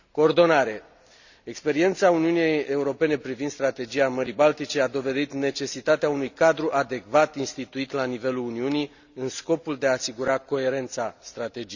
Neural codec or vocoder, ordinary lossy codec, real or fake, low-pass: none; none; real; 7.2 kHz